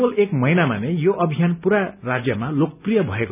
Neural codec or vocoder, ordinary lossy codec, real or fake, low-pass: none; none; real; 3.6 kHz